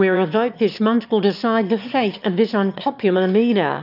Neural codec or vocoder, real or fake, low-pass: autoencoder, 22.05 kHz, a latent of 192 numbers a frame, VITS, trained on one speaker; fake; 5.4 kHz